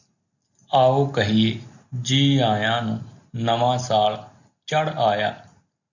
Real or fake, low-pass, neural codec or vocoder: real; 7.2 kHz; none